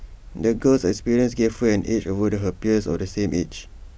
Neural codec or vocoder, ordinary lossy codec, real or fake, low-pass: none; none; real; none